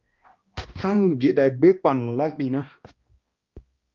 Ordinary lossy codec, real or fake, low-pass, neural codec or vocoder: Opus, 32 kbps; fake; 7.2 kHz; codec, 16 kHz, 1 kbps, X-Codec, HuBERT features, trained on balanced general audio